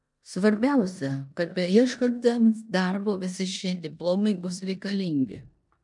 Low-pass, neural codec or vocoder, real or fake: 10.8 kHz; codec, 16 kHz in and 24 kHz out, 0.9 kbps, LongCat-Audio-Codec, four codebook decoder; fake